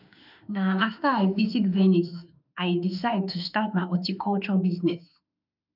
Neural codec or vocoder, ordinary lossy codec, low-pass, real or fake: autoencoder, 48 kHz, 32 numbers a frame, DAC-VAE, trained on Japanese speech; none; 5.4 kHz; fake